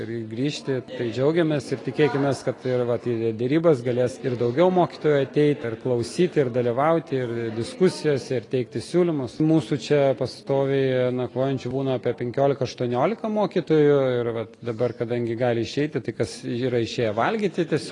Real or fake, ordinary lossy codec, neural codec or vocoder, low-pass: real; AAC, 32 kbps; none; 10.8 kHz